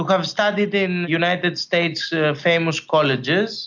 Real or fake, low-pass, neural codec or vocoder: real; 7.2 kHz; none